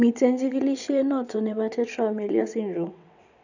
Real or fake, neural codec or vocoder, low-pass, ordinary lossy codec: fake; vocoder, 22.05 kHz, 80 mel bands, WaveNeXt; 7.2 kHz; none